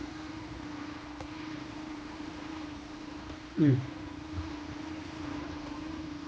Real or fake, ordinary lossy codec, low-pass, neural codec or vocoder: fake; none; none; codec, 16 kHz, 2 kbps, X-Codec, HuBERT features, trained on general audio